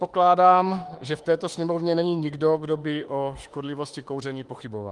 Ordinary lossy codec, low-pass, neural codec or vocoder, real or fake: Opus, 24 kbps; 10.8 kHz; autoencoder, 48 kHz, 32 numbers a frame, DAC-VAE, trained on Japanese speech; fake